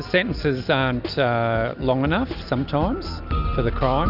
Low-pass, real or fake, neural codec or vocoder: 5.4 kHz; real; none